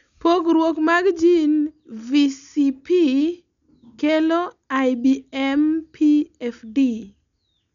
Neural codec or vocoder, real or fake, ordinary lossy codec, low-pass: none; real; none; 7.2 kHz